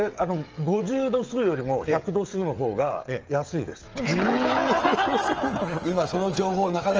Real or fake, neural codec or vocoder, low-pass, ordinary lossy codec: fake; codec, 16 kHz, 16 kbps, FreqCodec, smaller model; 7.2 kHz; Opus, 24 kbps